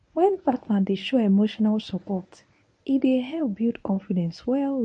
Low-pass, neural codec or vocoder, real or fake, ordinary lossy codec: 10.8 kHz; codec, 24 kHz, 0.9 kbps, WavTokenizer, medium speech release version 1; fake; MP3, 48 kbps